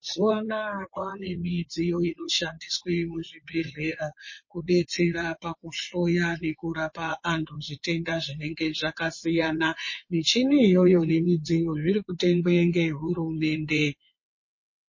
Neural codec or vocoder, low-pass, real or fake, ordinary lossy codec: vocoder, 22.05 kHz, 80 mel bands, WaveNeXt; 7.2 kHz; fake; MP3, 32 kbps